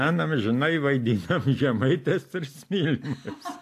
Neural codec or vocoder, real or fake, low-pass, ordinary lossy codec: none; real; 14.4 kHz; AAC, 64 kbps